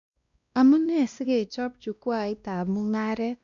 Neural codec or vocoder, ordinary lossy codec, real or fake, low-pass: codec, 16 kHz, 1 kbps, X-Codec, WavLM features, trained on Multilingual LibriSpeech; MP3, 64 kbps; fake; 7.2 kHz